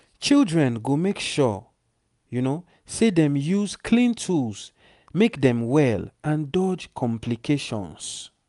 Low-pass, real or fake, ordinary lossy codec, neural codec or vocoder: 10.8 kHz; real; none; none